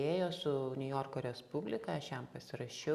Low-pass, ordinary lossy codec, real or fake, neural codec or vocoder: 14.4 kHz; Opus, 64 kbps; real; none